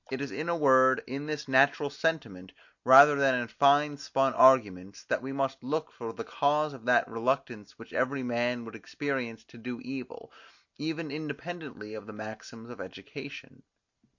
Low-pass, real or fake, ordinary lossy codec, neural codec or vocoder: 7.2 kHz; real; MP3, 48 kbps; none